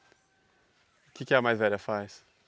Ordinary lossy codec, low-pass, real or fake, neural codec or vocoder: none; none; real; none